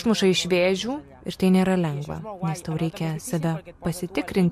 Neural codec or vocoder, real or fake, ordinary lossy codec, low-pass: none; real; MP3, 64 kbps; 14.4 kHz